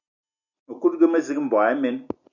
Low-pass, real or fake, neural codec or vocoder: 7.2 kHz; real; none